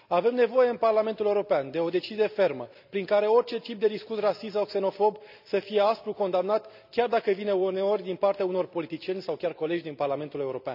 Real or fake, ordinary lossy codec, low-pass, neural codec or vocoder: real; none; 5.4 kHz; none